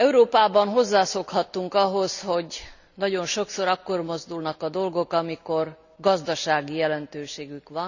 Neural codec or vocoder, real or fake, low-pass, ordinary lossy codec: none; real; 7.2 kHz; none